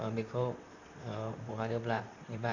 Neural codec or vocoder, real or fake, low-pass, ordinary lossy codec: vocoder, 44.1 kHz, 128 mel bands, Pupu-Vocoder; fake; 7.2 kHz; none